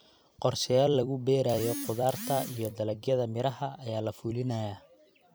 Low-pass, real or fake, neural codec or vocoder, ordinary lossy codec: none; fake; vocoder, 44.1 kHz, 128 mel bands every 256 samples, BigVGAN v2; none